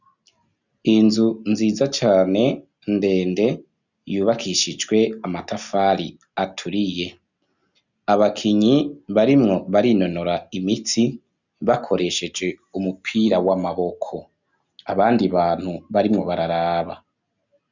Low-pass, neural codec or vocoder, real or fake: 7.2 kHz; none; real